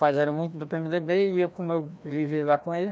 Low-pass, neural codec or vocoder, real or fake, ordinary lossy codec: none; codec, 16 kHz, 1 kbps, FreqCodec, larger model; fake; none